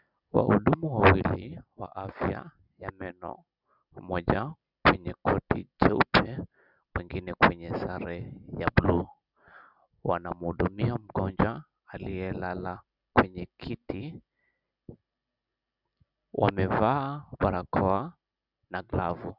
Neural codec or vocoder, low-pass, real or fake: none; 5.4 kHz; real